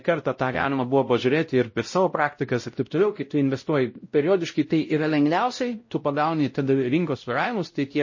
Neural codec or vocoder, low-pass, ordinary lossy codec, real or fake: codec, 16 kHz, 0.5 kbps, X-Codec, WavLM features, trained on Multilingual LibriSpeech; 7.2 kHz; MP3, 32 kbps; fake